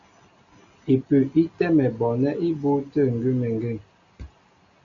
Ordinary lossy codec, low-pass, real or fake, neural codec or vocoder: MP3, 64 kbps; 7.2 kHz; real; none